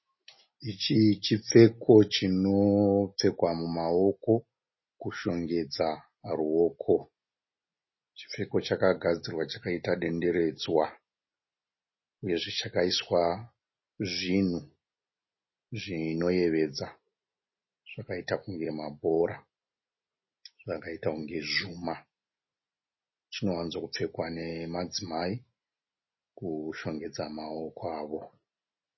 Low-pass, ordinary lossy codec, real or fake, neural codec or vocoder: 7.2 kHz; MP3, 24 kbps; real; none